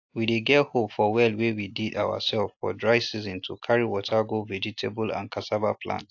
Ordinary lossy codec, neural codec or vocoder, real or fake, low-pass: AAC, 48 kbps; none; real; 7.2 kHz